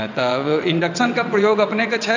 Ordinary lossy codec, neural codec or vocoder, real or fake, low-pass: AAC, 48 kbps; vocoder, 22.05 kHz, 80 mel bands, WaveNeXt; fake; 7.2 kHz